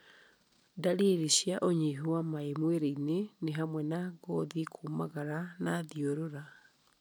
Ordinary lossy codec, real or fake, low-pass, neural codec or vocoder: none; real; none; none